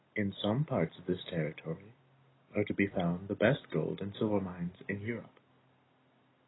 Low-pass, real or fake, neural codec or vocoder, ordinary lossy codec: 7.2 kHz; real; none; AAC, 16 kbps